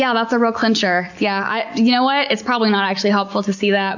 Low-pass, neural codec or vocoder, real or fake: 7.2 kHz; none; real